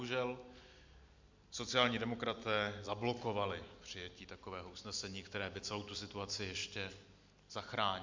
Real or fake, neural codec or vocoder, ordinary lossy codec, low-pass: real; none; MP3, 64 kbps; 7.2 kHz